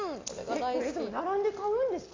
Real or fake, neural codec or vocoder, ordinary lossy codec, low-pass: real; none; AAC, 32 kbps; 7.2 kHz